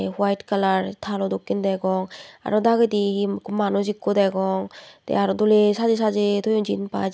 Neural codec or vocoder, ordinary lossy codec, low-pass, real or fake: none; none; none; real